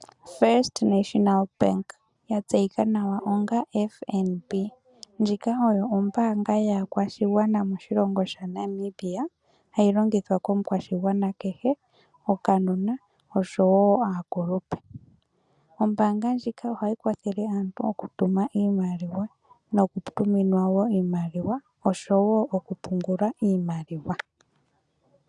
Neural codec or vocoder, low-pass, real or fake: none; 10.8 kHz; real